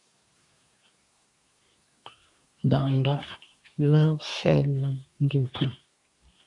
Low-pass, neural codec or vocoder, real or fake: 10.8 kHz; codec, 24 kHz, 1 kbps, SNAC; fake